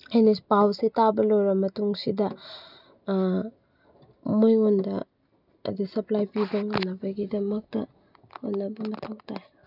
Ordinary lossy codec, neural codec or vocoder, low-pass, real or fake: none; none; 5.4 kHz; real